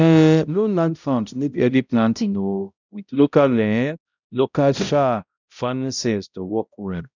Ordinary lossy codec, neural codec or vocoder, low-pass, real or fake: none; codec, 16 kHz, 0.5 kbps, X-Codec, HuBERT features, trained on balanced general audio; 7.2 kHz; fake